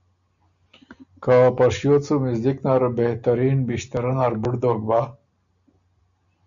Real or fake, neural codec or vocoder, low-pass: real; none; 7.2 kHz